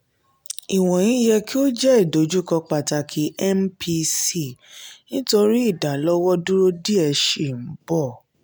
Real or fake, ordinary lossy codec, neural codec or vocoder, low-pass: real; none; none; none